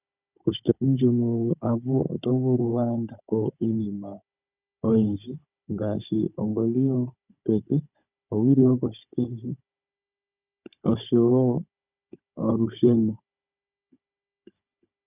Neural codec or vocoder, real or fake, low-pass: codec, 16 kHz, 16 kbps, FunCodec, trained on Chinese and English, 50 frames a second; fake; 3.6 kHz